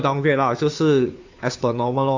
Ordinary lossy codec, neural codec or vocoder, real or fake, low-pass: AAC, 48 kbps; codec, 16 kHz, 2 kbps, FunCodec, trained on Chinese and English, 25 frames a second; fake; 7.2 kHz